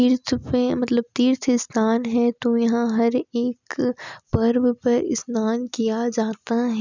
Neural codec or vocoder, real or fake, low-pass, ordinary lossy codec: none; real; 7.2 kHz; none